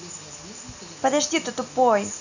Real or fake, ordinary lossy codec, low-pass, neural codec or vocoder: real; none; 7.2 kHz; none